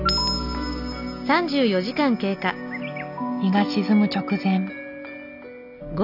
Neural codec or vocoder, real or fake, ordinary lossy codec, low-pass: none; real; none; 5.4 kHz